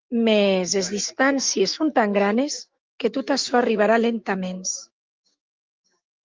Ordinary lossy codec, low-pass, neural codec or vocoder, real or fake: Opus, 32 kbps; 7.2 kHz; autoencoder, 48 kHz, 128 numbers a frame, DAC-VAE, trained on Japanese speech; fake